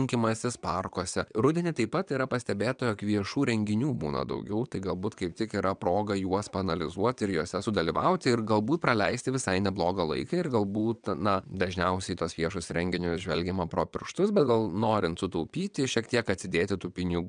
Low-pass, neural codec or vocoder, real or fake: 9.9 kHz; vocoder, 22.05 kHz, 80 mel bands, WaveNeXt; fake